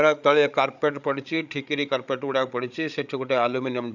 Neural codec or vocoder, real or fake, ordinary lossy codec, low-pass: codec, 16 kHz, 16 kbps, FreqCodec, larger model; fake; none; 7.2 kHz